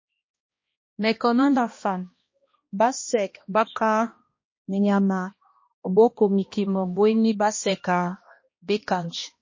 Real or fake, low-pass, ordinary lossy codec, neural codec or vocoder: fake; 7.2 kHz; MP3, 32 kbps; codec, 16 kHz, 1 kbps, X-Codec, HuBERT features, trained on balanced general audio